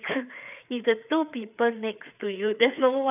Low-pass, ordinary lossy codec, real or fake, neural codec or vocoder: 3.6 kHz; none; fake; codec, 16 kHz, 4 kbps, FreqCodec, larger model